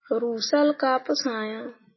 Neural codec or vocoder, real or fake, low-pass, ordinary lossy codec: none; real; 7.2 kHz; MP3, 24 kbps